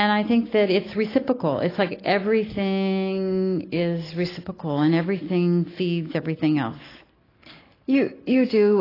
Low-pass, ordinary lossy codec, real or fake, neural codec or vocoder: 5.4 kHz; AAC, 24 kbps; real; none